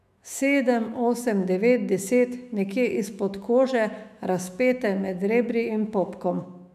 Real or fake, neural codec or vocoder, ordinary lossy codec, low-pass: fake; autoencoder, 48 kHz, 128 numbers a frame, DAC-VAE, trained on Japanese speech; none; 14.4 kHz